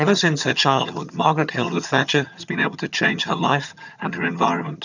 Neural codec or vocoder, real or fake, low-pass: vocoder, 22.05 kHz, 80 mel bands, HiFi-GAN; fake; 7.2 kHz